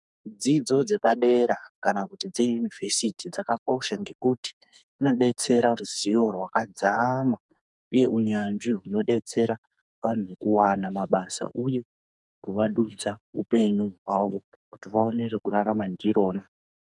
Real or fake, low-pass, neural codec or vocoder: fake; 10.8 kHz; codec, 44.1 kHz, 2.6 kbps, SNAC